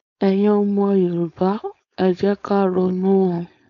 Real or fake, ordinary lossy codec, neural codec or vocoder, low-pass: fake; none; codec, 16 kHz, 4.8 kbps, FACodec; 7.2 kHz